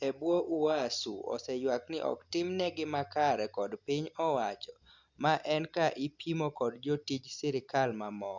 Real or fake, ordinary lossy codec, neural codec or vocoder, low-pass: real; Opus, 64 kbps; none; 7.2 kHz